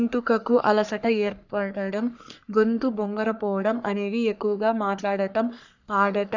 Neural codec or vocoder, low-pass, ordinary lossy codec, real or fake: codec, 44.1 kHz, 3.4 kbps, Pupu-Codec; 7.2 kHz; none; fake